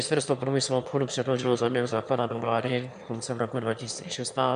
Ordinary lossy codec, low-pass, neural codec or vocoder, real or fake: MP3, 96 kbps; 9.9 kHz; autoencoder, 22.05 kHz, a latent of 192 numbers a frame, VITS, trained on one speaker; fake